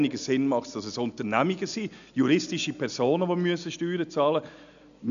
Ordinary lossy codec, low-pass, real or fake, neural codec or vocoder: none; 7.2 kHz; real; none